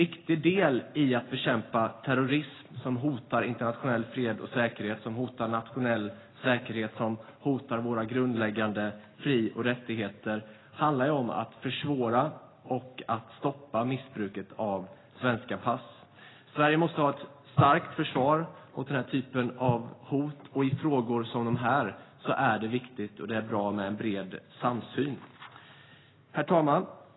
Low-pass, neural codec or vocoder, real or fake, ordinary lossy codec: 7.2 kHz; none; real; AAC, 16 kbps